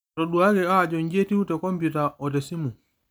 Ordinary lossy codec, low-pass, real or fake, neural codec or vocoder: none; none; real; none